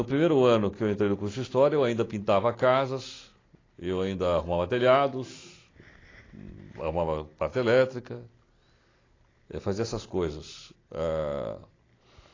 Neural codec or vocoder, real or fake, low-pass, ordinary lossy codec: none; real; 7.2 kHz; AAC, 32 kbps